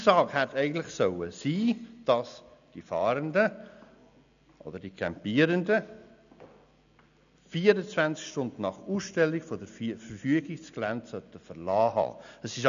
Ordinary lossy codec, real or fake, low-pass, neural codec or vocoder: none; real; 7.2 kHz; none